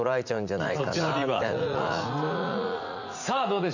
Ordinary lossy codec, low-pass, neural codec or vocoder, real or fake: none; 7.2 kHz; vocoder, 44.1 kHz, 80 mel bands, Vocos; fake